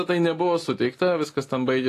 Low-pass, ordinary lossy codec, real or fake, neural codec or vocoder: 14.4 kHz; AAC, 48 kbps; real; none